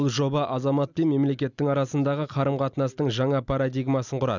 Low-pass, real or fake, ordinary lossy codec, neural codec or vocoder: 7.2 kHz; real; none; none